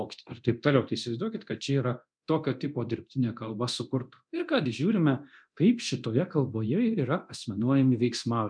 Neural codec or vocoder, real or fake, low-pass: codec, 24 kHz, 0.9 kbps, DualCodec; fake; 9.9 kHz